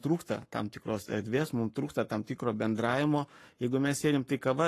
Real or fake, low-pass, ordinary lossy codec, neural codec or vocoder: fake; 14.4 kHz; AAC, 48 kbps; codec, 44.1 kHz, 7.8 kbps, Pupu-Codec